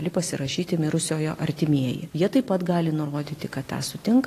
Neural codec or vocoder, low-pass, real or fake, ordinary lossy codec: none; 14.4 kHz; real; AAC, 48 kbps